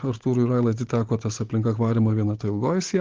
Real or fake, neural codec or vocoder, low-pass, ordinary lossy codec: fake; codec, 16 kHz, 16 kbps, FunCodec, trained on Chinese and English, 50 frames a second; 7.2 kHz; Opus, 16 kbps